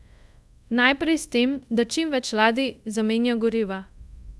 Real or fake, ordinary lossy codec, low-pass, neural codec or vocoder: fake; none; none; codec, 24 kHz, 0.5 kbps, DualCodec